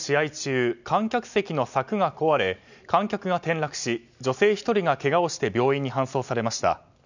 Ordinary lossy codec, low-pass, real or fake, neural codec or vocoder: none; 7.2 kHz; real; none